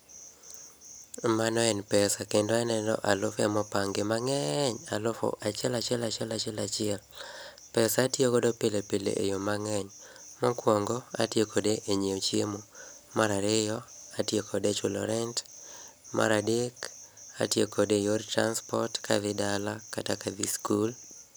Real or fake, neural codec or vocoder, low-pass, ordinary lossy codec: real; none; none; none